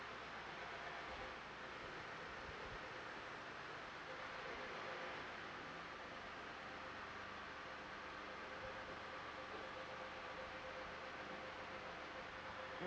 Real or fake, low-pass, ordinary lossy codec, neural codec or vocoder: real; none; none; none